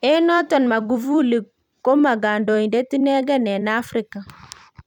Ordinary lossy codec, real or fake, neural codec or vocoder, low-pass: none; fake; vocoder, 44.1 kHz, 128 mel bands every 256 samples, BigVGAN v2; 19.8 kHz